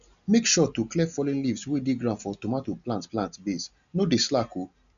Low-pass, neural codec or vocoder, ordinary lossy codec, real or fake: 7.2 kHz; none; none; real